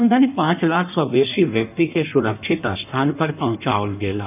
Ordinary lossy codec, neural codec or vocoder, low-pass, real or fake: none; codec, 16 kHz in and 24 kHz out, 1.1 kbps, FireRedTTS-2 codec; 3.6 kHz; fake